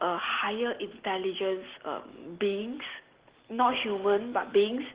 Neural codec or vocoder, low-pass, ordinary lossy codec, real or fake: none; 3.6 kHz; Opus, 16 kbps; real